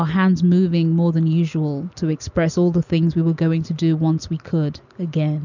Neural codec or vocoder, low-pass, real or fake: none; 7.2 kHz; real